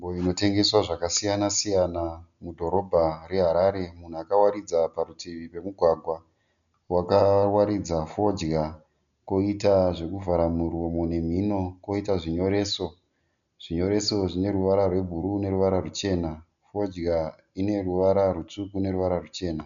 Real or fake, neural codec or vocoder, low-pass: real; none; 7.2 kHz